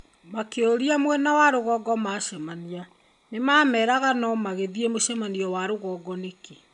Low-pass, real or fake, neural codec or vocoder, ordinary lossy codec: 10.8 kHz; real; none; none